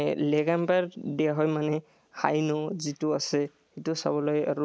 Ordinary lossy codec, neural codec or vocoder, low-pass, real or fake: none; none; none; real